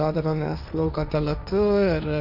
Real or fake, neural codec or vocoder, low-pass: fake; codec, 16 kHz, 1.1 kbps, Voila-Tokenizer; 5.4 kHz